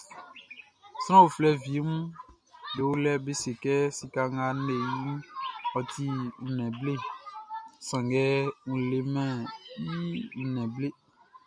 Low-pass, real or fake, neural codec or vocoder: 9.9 kHz; real; none